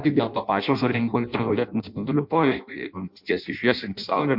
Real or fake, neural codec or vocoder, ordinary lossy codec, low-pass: fake; codec, 16 kHz in and 24 kHz out, 0.6 kbps, FireRedTTS-2 codec; MP3, 48 kbps; 5.4 kHz